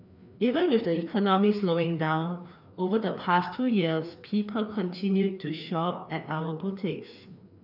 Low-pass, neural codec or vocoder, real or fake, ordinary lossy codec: 5.4 kHz; codec, 16 kHz, 2 kbps, FreqCodec, larger model; fake; none